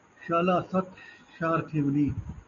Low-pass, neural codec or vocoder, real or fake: 7.2 kHz; none; real